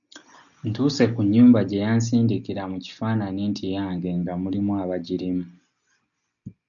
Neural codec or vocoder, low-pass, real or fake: none; 7.2 kHz; real